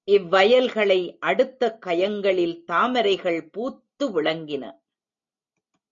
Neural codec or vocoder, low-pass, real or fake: none; 7.2 kHz; real